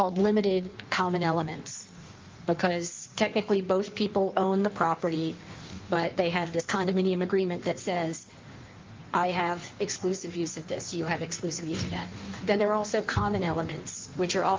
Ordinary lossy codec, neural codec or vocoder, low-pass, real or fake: Opus, 32 kbps; codec, 16 kHz in and 24 kHz out, 1.1 kbps, FireRedTTS-2 codec; 7.2 kHz; fake